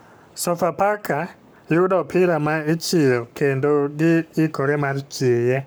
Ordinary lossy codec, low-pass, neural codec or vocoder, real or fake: none; none; codec, 44.1 kHz, 7.8 kbps, Pupu-Codec; fake